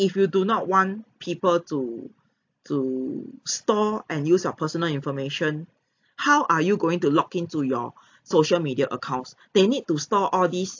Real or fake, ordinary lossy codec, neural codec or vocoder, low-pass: real; AAC, 48 kbps; none; 7.2 kHz